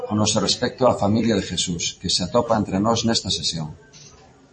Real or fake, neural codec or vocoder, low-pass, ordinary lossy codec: fake; vocoder, 44.1 kHz, 128 mel bands every 256 samples, BigVGAN v2; 10.8 kHz; MP3, 32 kbps